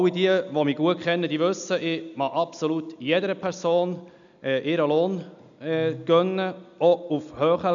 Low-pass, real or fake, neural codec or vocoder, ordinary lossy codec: 7.2 kHz; real; none; none